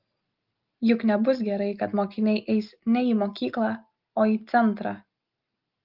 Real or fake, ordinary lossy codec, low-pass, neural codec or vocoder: real; Opus, 32 kbps; 5.4 kHz; none